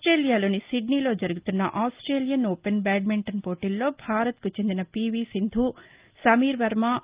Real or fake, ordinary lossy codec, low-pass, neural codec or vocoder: real; Opus, 32 kbps; 3.6 kHz; none